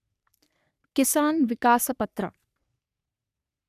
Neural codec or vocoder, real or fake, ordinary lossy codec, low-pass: codec, 44.1 kHz, 3.4 kbps, Pupu-Codec; fake; none; 14.4 kHz